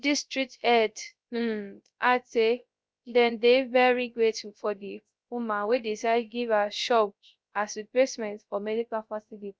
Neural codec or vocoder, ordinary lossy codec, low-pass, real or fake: codec, 16 kHz, 0.3 kbps, FocalCodec; none; none; fake